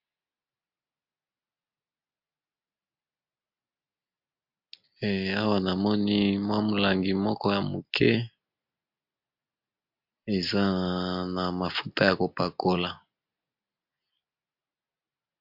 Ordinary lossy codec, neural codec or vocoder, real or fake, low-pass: MP3, 48 kbps; none; real; 5.4 kHz